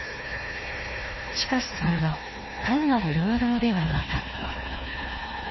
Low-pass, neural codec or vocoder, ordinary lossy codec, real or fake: 7.2 kHz; codec, 16 kHz, 1 kbps, FunCodec, trained on Chinese and English, 50 frames a second; MP3, 24 kbps; fake